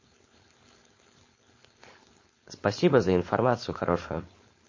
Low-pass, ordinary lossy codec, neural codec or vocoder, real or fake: 7.2 kHz; MP3, 32 kbps; codec, 16 kHz, 4.8 kbps, FACodec; fake